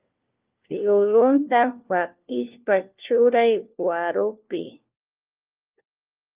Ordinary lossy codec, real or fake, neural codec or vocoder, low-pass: Opus, 24 kbps; fake; codec, 16 kHz, 1 kbps, FunCodec, trained on LibriTTS, 50 frames a second; 3.6 kHz